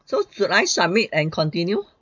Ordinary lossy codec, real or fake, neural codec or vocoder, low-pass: MP3, 64 kbps; real; none; 7.2 kHz